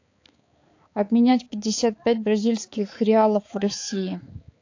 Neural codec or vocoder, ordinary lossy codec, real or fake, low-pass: codec, 16 kHz, 4 kbps, X-Codec, HuBERT features, trained on balanced general audio; AAC, 48 kbps; fake; 7.2 kHz